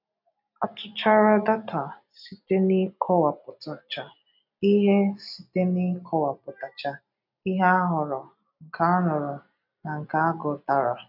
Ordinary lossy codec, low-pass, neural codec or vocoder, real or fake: none; 5.4 kHz; none; real